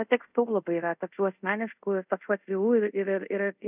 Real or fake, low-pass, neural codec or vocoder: fake; 3.6 kHz; codec, 24 kHz, 0.5 kbps, DualCodec